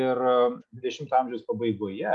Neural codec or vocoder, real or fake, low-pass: none; real; 10.8 kHz